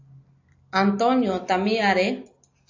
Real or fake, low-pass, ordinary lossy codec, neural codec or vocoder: real; 7.2 kHz; AAC, 48 kbps; none